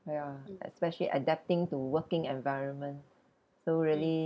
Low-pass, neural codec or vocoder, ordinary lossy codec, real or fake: none; none; none; real